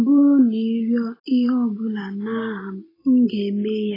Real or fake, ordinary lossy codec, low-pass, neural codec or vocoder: real; AAC, 24 kbps; 5.4 kHz; none